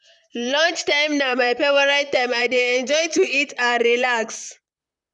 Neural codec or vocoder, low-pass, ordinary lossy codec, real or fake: vocoder, 44.1 kHz, 128 mel bands, Pupu-Vocoder; 10.8 kHz; none; fake